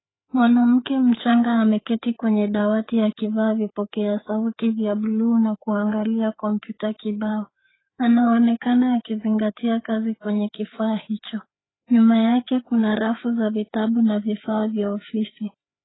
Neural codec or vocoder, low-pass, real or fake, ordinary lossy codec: codec, 16 kHz, 4 kbps, FreqCodec, larger model; 7.2 kHz; fake; AAC, 16 kbps